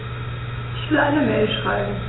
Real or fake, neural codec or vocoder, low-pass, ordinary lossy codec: real; none; 7.2 kHz; AAC, 16 kbps